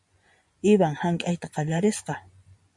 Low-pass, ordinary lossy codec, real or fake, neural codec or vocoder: 10.8 kHz; MP3, 64 kbps; fake; vocoder, 24 kHz, 100 mel bands, Vocos